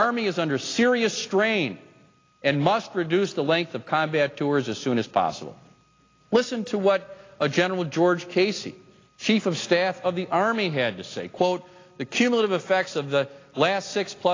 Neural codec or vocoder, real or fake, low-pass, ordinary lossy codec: none; real; 7.2 kHz; AAC, 32 kbps